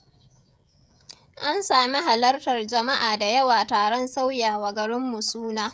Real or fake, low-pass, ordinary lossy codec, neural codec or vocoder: fake; none; none; codec, 16 kHz, 16 kbps, FreqCodec, smaller model